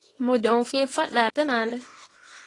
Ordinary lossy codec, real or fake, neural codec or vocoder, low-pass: AAC, 32 kbps; fake; codec, 24 kHz, 0.9 kbps, WavTokenizer, small release; 10.8 kHz